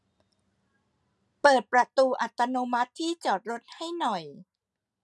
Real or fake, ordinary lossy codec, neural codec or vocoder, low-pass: real; none; none; none